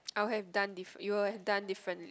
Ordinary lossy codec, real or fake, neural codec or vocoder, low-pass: none; real; none; none